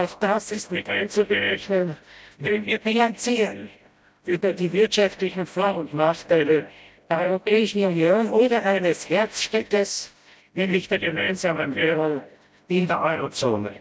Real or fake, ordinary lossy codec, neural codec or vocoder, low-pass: fake; none; codec, 16 kHz, 0.5 kbps, FreqCodec, smaller model; none